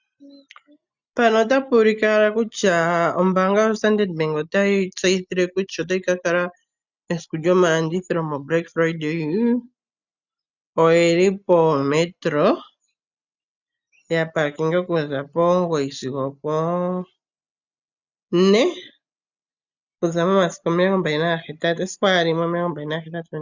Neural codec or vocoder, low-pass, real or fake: none; 7.2 kHz; real